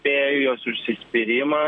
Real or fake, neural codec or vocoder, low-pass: fake; vocoder, 44.1 kHz, 128 mel bands every 256 samples, BigVGAN v2; 9.9 kHz